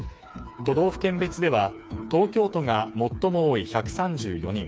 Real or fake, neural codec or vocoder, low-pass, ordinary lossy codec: fake; codec, 16 kHz, 4 kbps, FreqCodec, smaller model; none; none